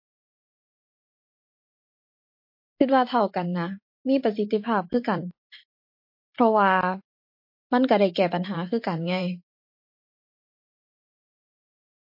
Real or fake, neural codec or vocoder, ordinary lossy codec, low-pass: fake; vocoder, 44.1 kHz, 128 mel bands every 512 samples, BigVGAN v2; MP3, 32 kbps; 5.4 kHz